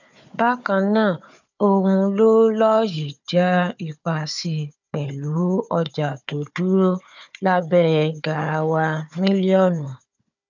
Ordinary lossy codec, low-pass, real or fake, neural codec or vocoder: none; 7.2 kHz; fake; codec, 16 kHz, 4 kbps, FunCodec, trained on Chinese and English, 50 frames a second